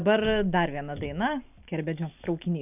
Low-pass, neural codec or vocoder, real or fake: 3.6 kHz; none; real